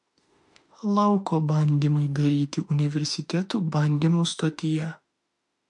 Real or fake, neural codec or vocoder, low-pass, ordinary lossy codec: fake; autoencoder, 48 kHz, 32 numbers a frame, DAC-VAE, trained on Japanese speech; 10.8 kHz; MP3, 64 kbps